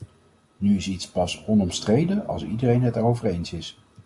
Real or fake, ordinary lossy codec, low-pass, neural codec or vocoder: real; MP3, 48 kbps; 10.8 kHz; none